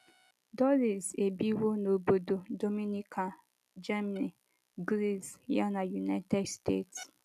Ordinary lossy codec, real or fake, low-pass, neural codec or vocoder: none; real; 14.4 kHz; none